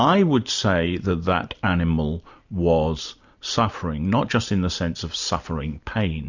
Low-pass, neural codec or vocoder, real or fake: 7.2 kHz; none; real